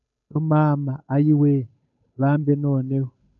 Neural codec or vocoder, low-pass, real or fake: codec, 16 kHz, 8 kbps, FunCodec, trained on Chinese and English, 25 frames a second; 7.2 kHz; fake